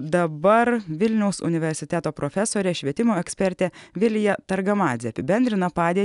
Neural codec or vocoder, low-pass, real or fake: none; 10.8 kHz; real